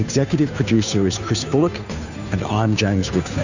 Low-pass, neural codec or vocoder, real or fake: 7.2 kHz; codec, 16 kHz, 2 kbps, FunCodec, trained on Chinese and English, 25 frames a second; fake